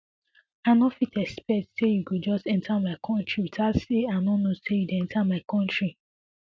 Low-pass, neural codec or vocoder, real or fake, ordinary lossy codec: none; none; real; none